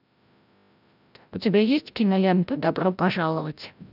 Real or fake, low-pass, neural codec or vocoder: fake; 5.4 kHz; codec, 16 kHz, 0.5 kbps, FreqCodec, larger model